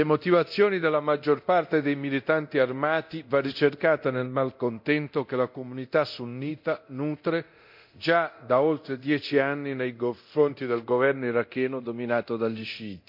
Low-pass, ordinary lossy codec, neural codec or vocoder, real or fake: 5.4 kHz; MP3, 48 kbps; codec, 24 kHz, 0.9 kbps, DualCodec; fake